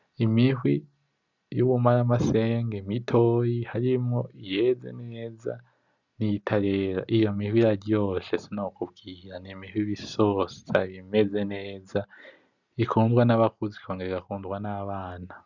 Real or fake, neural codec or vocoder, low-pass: real; none; 7.2 kHz